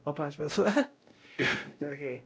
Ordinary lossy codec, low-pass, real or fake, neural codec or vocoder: none; none; fake; codec, 16 kHz, 0.5 kbps, X-Codec, WavLM features, trained on Multilingual LibriSpeech